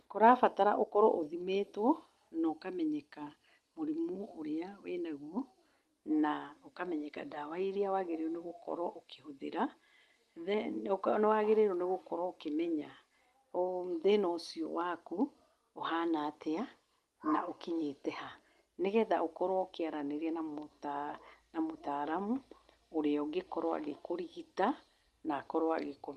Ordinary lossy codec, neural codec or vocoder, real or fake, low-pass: Opus, 24 kbps; none; real; 14.4 kHz